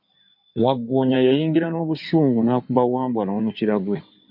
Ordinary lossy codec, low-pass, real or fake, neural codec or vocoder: MP3, 48 kbps; 5.4 kHz; fake; codec, 16 kHz in and 24 kHz out, 2.2 kbps, FireRedTTS-2 codec